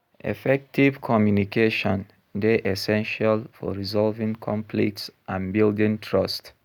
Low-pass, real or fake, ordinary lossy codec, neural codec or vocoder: 19.8 kHz; real; none; none